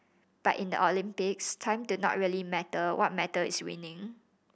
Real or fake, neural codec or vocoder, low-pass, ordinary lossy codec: real; none; none; none